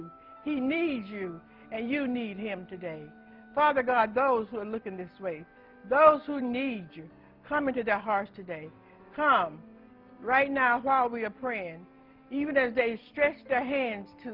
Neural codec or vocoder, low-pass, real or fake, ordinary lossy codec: none; 5.4 kHz; real; Opus, 24 kbps